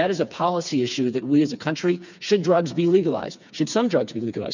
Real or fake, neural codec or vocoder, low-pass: fake; codec, 16 kHz, 4 kbps, FreqCodec, smaller model; 7.2 kHz